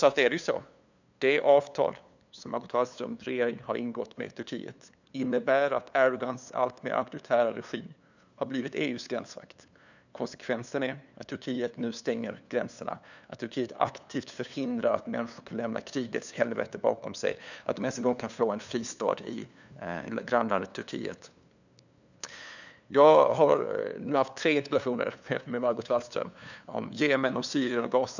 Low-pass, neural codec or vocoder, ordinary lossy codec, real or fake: 7.2 kHz; codec, 16 kHz, 2 kbps, FunCodec, trained on LibriTTS, 25 frames a second; none; fake